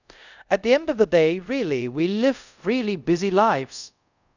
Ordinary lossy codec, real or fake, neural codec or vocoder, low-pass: none; fake; codec, 24 kHz, 0.5 kbps, DualCodec; 7.2 kHz